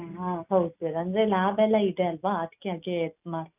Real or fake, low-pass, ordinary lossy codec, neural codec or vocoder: real; 3.6 kHz; none; none